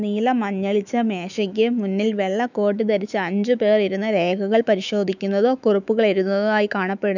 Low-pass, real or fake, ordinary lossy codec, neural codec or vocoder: 7.2 kHz; fake; none; autoencoder, 48 kHz, 128 numbers a frame, DAC-VAE, trained on Japanese speech